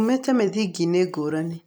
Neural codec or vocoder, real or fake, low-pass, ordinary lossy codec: none; real; none; none